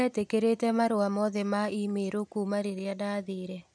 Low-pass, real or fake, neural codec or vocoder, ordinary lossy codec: none; real; none; none